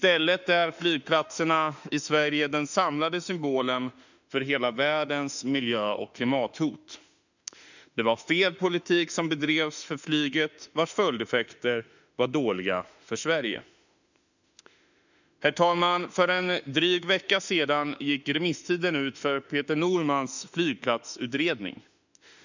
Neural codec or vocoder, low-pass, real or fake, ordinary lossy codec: autoencoder, 48 kHz, 32 numbers a frame, DAC-VAE, trained on Japanese speech; 7.2 kHz; fake; none